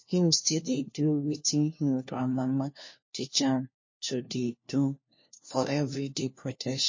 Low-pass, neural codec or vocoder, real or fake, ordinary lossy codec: 7.2 kHz; codec, 16 kHz, 1 kbps, FunCodec, trained on LibriTTS, 50 frames a second; fake; MP3, 32 kbps